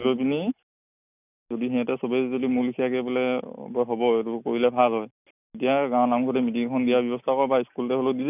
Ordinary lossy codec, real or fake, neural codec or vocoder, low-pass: none; real; none; 3.6 kHz